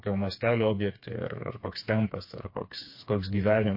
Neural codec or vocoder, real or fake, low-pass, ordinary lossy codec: codec, 16 kHz, 4 kbps, FreqCodec, smaller model; fake; 5.4 kHz; MP3, 32 kbps